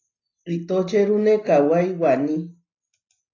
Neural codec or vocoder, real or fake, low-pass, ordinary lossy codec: none; real; 7.2 kHz; AAC, 32 kbps